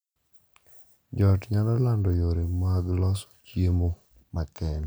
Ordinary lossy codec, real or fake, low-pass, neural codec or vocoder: none; real; none; none